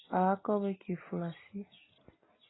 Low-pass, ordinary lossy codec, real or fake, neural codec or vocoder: 7.2 kHz; AAC, 16 kbps; real; none